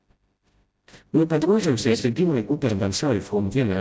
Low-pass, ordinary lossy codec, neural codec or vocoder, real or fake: none; none; codec, 16 kHz, 0.5 kbps, FreqCodec, smaller model; fake